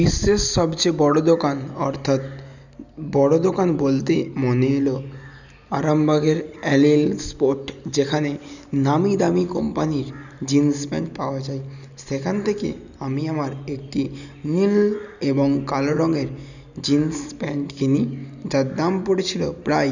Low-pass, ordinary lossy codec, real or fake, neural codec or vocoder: 7.2 kHz; none; real; none